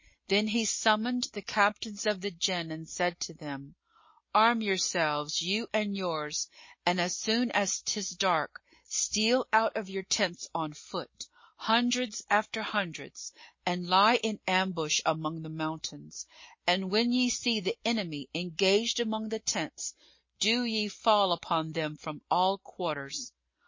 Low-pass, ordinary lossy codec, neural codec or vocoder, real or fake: 7.2 kHz; MP3, 32 kbps; none; real